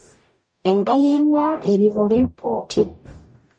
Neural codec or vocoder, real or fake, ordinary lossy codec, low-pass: codec, 44.1 kHz, 0.9 kbps, DAC; fake; MP3, 48 kbps; 9.9 kHz